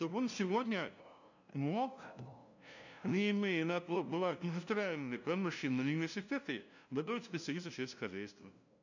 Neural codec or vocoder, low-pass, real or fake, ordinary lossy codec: codec, 16 kHz, 0.5 kbps, FunCodec, trained on LibriTTS, 25 frames a second; 7.2 kHz; fake; none